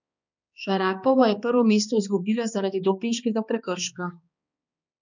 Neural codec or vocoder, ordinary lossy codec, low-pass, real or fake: codec, 16 kHz, 2 kbps, X-Codec, HuBERT features, trained on balanced general audio; none; 7.2 kHz; fake